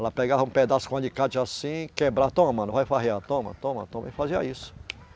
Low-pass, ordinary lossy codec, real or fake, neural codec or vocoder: none; none; real; none